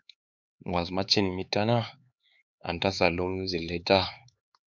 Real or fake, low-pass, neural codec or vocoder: fake; 7.2 kHz; codec, 16 kHz, 4 kbps, X-Codec, HuBERT features, trained on LibriSpeech